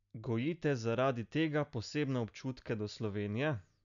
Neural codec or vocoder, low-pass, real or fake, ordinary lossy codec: none; 7.2 kHz; real; none